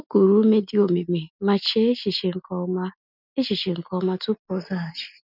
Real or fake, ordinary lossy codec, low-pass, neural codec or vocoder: real; none; 5.4 kHz; none